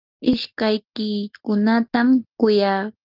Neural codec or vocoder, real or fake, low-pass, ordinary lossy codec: none; real; 5.4 kHz; Opus, 32 kbps